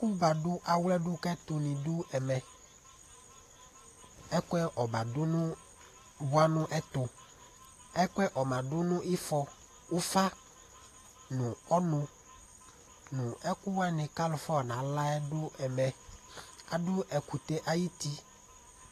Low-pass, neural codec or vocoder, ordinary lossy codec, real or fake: 14.4 kHz; none; AAC, 48 kbps; real